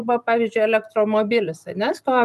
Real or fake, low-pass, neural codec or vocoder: real; 14.4 kHz; none